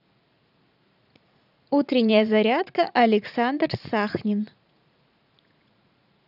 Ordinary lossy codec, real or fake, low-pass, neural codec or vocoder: none; fake; 5.4 kHz; vocoder, 44.1 kHz, 80 mel bands, Vocos